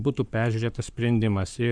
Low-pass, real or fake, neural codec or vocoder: 9.9 kHz; fake; codec, 44.1 kHz, 7.8 kbps, Pupu-Codec